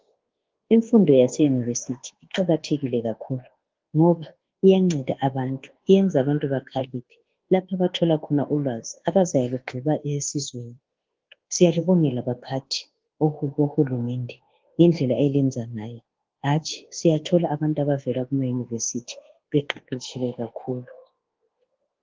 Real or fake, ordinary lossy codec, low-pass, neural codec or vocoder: fake; Opus, 16 kbps; 7.2 kHz; codec, 24 kHz, 1.2 kbps, DualCodec